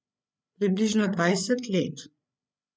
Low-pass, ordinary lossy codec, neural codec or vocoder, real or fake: none; none; codec, 16 kHz, 8 kbps, FreqCodec, larger model; fake